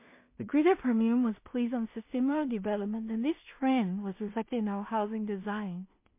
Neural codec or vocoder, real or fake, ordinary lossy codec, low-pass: codec, 16 kHz in and 24 kHz out, 0.4 kbps, LongCat-Audio-Codec, two codebook decoder; fake; MP3, 24 kbps; 3.6 kHz